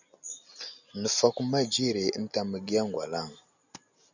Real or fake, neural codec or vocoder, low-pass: real; none; 7.2 kHz